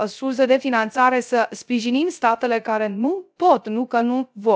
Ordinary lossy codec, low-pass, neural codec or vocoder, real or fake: none; none; codec, 16 kHz, 0.3 kbps, FocalCodec; fake